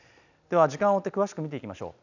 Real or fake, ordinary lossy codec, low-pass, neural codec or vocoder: real; none; 7.2 kHz; none